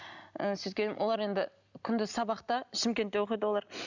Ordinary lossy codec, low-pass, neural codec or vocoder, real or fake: none; 7.2 kHz; none; real